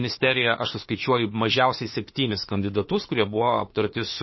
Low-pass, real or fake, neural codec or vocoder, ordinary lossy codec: 7.2 kHz; fake; autoencoder, 48 kHz, 32 numbers a frame, DAC-VAE, trained on Japanese speech; MP3, 24 kbps